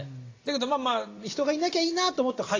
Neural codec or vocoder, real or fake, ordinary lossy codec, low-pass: none; real; AAC, 32 kbps; 7.2 kHz